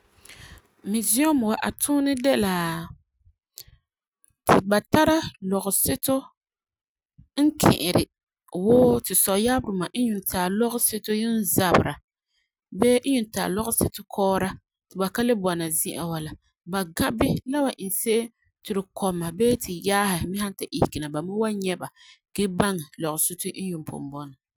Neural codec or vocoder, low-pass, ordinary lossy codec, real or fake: none; none; none; real